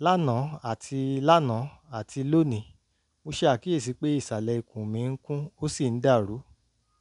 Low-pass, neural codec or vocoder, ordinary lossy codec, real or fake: 10.8 kHz; none; none; real